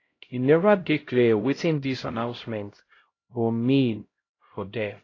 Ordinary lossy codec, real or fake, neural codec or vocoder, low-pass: AAC, 32 kbps; fake; codec, 16 kHz, 0.5 kbps, X-Codec, HuBERT features, trained on LibriSpeech; 7.2 kHz